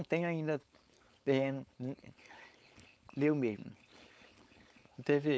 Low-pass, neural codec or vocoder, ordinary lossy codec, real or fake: none; codec, 16 kHz, 4.8 kbps, FACodec; none; fake